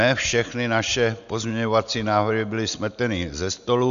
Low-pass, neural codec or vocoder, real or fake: 7.2 kHz; none; real